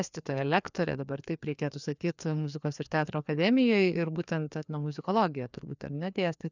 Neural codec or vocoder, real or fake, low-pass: codec, 16 kHz, 2 kbps, FreqCodec, larger model; fake; 7.2 kHz